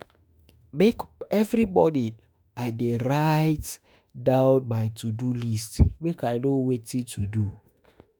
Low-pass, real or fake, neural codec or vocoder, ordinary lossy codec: none; fake; autoencoder, 48 kHz, 32 numbers a frame, DAC-VAE, trained on Japanese speech; none